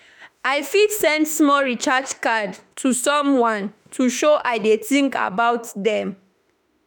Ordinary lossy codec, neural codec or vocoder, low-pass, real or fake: none; autoencoder, 48 kHz, 32 numbers a frame, DAC-VAE, trained on Japanese speech; none; fake